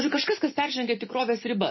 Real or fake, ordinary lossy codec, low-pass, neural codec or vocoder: real; MP3, 24 kbps; 7.2 kHz; none